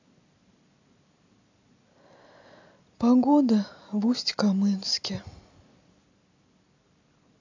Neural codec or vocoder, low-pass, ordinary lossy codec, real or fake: none; 7.2 kHz; AAC, 48 kbps; real